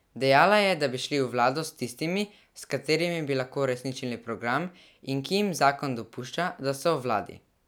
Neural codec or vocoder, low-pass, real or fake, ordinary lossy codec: none; none; real; none